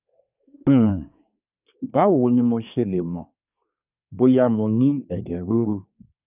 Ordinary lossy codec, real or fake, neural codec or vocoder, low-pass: none; fake; codec, 24 kHz, 1 kbps, SNAC; 3.6 kHz